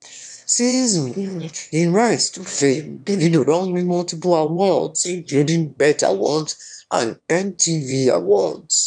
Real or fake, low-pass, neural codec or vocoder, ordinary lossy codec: fake; 9.9 kHz; autoencoder, 22.05 kHz, a latent of 192 numbers a frame, VITS, trained on one speaker; none